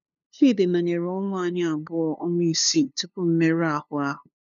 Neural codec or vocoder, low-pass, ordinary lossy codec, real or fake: codec, 16 kHz, 2 kbps, FunCodec, trained on LibriTTS, 25 frames a second; 7.2 kHz; none; fake